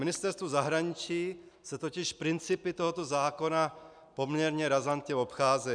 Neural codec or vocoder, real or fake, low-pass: none; real; 9.9 kHz